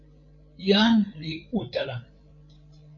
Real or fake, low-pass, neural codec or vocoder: fake; 7.2 kHz; codec, 16 kHz, 8 kbps, FreqCodec, larger model